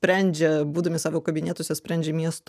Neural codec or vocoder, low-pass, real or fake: none; 14.4 kHz; real